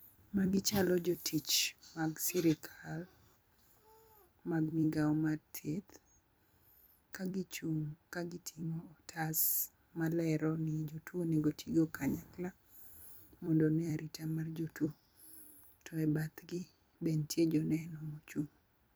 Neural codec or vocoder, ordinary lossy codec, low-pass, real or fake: vocoder, 44.1 kHz, 128 mel bands every 256 samples, BigVGAN v2; none; none; fake